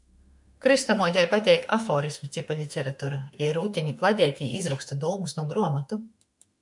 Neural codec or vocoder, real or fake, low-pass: autoencoder, 48 kHz, 32 numbers a frame, DAC-VAE, trained on Japanese speech; fake; 10.8 kHz